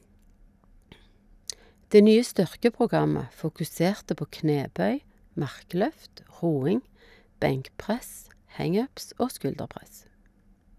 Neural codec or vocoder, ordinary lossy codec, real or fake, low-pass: none; none; real; 14.4 kHz